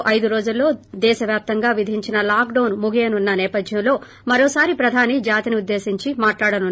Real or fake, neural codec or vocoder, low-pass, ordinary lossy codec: real; none; none; none